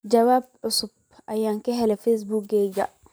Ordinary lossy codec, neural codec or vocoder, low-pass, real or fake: none; vocoder, 44.1 kHz, 128 mel bands every 512 samples, BigVGAN v2; none; fake